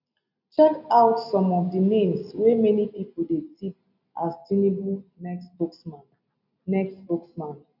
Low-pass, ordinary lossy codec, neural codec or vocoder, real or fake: 5.4 kHz; none; none; real